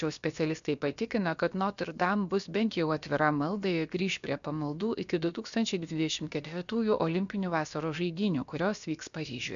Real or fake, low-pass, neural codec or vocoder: fake; 7.2 kHz; codec, 16 kHz, about 1 kbps, DyCAST, with the encoder's durations